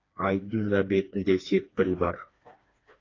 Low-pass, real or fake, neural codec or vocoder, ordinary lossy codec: 7.2 kHz; fake; codec, 16 kHz, 2 kbps, FreqCodec, smaller model; AAC, 32 kbps